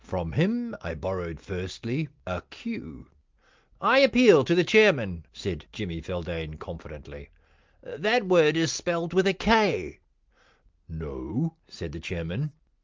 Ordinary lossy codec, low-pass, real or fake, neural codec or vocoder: Opus, 24 kbps; 7.2 kHz; real; none